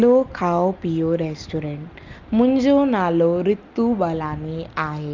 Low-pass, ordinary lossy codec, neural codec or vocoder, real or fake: 7.2 kHz; Opus, 32 kbps; none; real